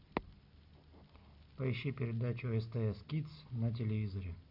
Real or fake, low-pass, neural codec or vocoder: real; 5.4 kHz; none